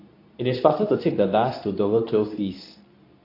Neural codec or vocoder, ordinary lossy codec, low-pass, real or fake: codec, 24 kHz, 0.9 kbps, WavTokenizer, medium speech release version 2; AAC, 32 kbps; 5.4 kHz; fake